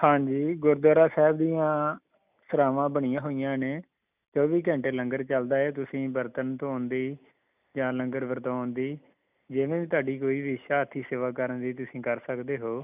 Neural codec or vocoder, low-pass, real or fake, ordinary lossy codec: none; 3.6 kHz; real; none